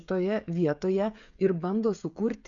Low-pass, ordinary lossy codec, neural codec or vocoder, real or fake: 7.2 kHz; MP3, 96 kbps; codec, 16 kHz, 16 kbps, FreqCodec, smaller model; fake